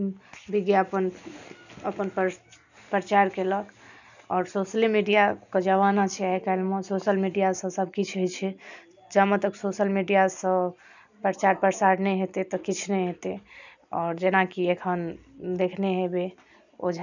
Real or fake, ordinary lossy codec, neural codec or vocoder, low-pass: real; none; none; 7.2 kHz